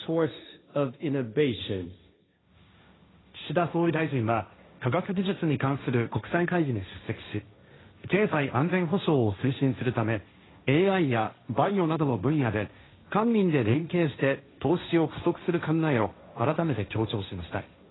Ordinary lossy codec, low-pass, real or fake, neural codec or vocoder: AAC, 16 kbps; 7.2 kHz; fake; codec, 16 kHz, 1.1 kbps, Voila-Tokenizer